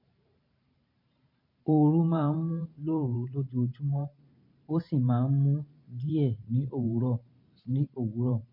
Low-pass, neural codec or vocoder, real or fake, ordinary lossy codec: 5.4 kHz; vocoder, 44.1 kHz, 128 mel bands every 512 samples, BigVGAN v2; fake; MP3, 32 kbps